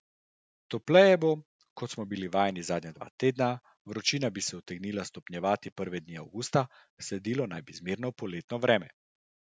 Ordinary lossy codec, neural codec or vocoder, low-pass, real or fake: none; none; none; real